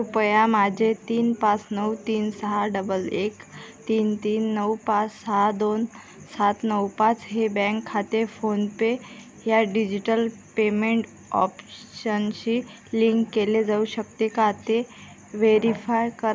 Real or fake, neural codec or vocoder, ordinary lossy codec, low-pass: real; none; none; none